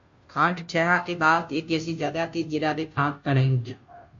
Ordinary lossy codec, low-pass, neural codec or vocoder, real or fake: MP3, 48 kbps; 7.2 kHz; codec, 16 kHz, 0.5 kbps, FunCodec, trained on Chinese and English, 25 frames a second; fake